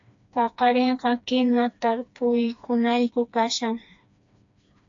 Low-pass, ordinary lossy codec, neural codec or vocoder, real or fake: 7.2 kHz; AAC, 64 kbps; codec, 16 kHz, 2 kbps, FreqCodec, smaller model; fake